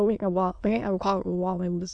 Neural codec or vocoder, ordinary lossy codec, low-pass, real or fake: autoencoder, 22.05 kHz, a latent of 192 numbers a frame, VITS, trained on many speakers; Opus, 64 kbps; 9.9 kHz; fake